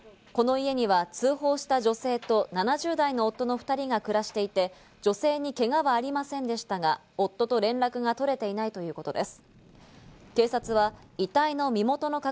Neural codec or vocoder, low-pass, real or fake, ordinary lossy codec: none; none; real; none